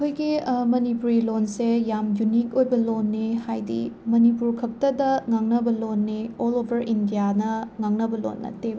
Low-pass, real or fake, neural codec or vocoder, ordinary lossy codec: none; real; none; none